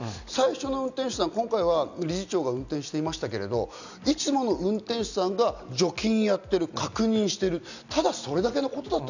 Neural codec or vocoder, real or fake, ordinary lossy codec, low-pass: none; real; none; 7.2 kHz